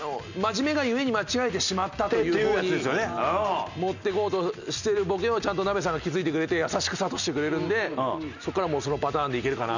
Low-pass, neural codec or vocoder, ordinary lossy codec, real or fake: 7.2 kHz; none; none; real